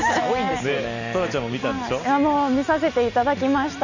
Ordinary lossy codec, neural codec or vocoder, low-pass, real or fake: none; none; 7.2 kHz; real